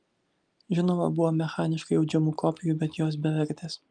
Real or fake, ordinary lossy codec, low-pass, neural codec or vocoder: real; Opus, 32 kbps; 9.9 kHz; none